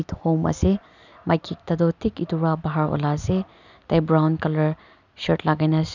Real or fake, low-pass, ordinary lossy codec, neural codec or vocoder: real; 7.2 kHz; none; none